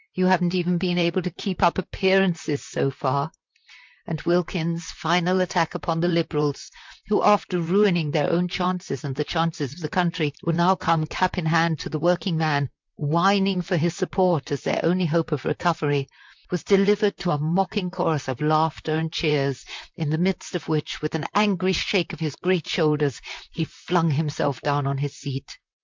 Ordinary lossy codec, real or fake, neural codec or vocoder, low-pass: MP3, 64 kbps; fake; vocoder, 44.1 kHz, 128 mel bands, Pupu-Vocoder; 7.2 kHz